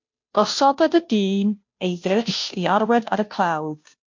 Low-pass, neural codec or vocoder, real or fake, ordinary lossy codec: 7.2 kHz; codec, 16 kHz, 0.5 kbps, FunCodec, trained on Chinese and English, 25 frames a second; fake; MP3, 48 kbps